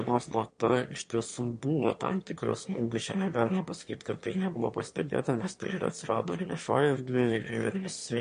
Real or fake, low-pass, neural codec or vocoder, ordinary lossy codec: fake; 9.9 kHz; autoencoder, 22.05 kHz, a latent of 192 numbers a frame, VITS, trained on one speaker; MP3, 48 kbps